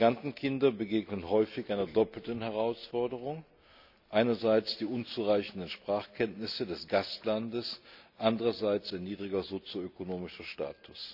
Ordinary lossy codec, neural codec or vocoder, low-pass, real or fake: none; none; 5.4 kHz; real